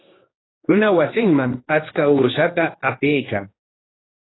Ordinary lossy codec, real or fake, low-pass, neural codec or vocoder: AAC, 16 kbps; fake; 7.2 kHz; codec, 16 kHz, 2 kbps, X-Codec, HuBERT features, trained on general audio